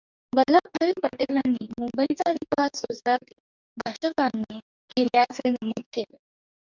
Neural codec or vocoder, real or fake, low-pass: codec, 44.1 kHz, 2.6 kbps, DAC; fake; 7.2 kHz